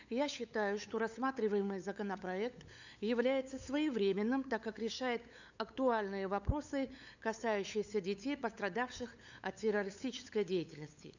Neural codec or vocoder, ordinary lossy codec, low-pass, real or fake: codec, 16 kHz, 8 kbps, FunCodec, trained on LibriTTS, 25 frames a second; none; 7.2 kHz; fake